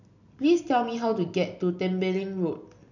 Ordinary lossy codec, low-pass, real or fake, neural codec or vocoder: none; 7.2 kHz; real; none